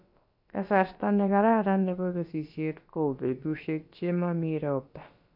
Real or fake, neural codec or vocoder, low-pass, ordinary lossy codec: fake; codec, 16 kHz, about 1 kbps, DyCAST, with the encoder's durations; 5.4 kHz; AAC, 48 kbps